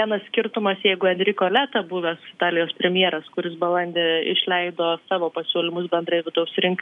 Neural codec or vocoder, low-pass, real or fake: none; 10.8 kHz; real